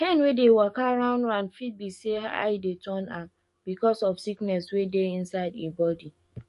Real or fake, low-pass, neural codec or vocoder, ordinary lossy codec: fake; 14.4 kHz; codec, 44.1 kHz, 7.8 kbps, DAC; MP3, 48 kbps